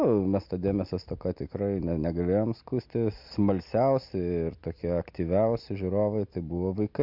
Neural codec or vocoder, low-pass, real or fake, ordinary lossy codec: none; 5.4 kHz; real; MP3, 48 kbps